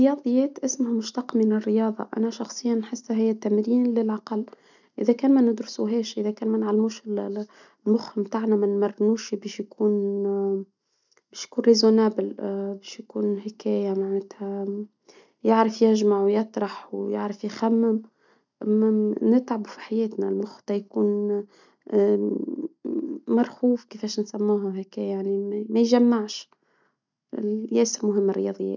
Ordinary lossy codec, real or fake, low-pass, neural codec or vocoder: none; real; 7.2 kHz; none